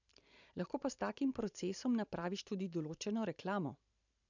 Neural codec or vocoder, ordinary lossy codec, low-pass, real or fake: vocoder, 44.1 kHz, 128 mel bands every 512 samples, BigVGAN v2; none; 7.2 kHz; fake